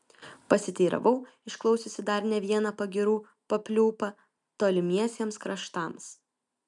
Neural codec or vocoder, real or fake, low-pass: none; real; 10.8 kHz